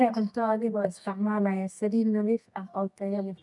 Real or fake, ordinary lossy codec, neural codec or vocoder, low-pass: fake; none; codec, 24 kHz, 0.9 kbps, WavTokenizer, medium music audio release; 10.8 kHz